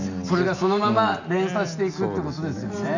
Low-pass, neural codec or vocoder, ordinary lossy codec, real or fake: 7.2 kHz; none; none; real